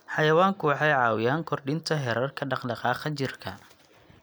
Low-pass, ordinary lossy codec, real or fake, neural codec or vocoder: none; none; real; none